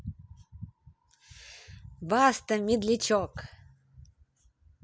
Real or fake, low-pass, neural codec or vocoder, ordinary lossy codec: real; none; none; none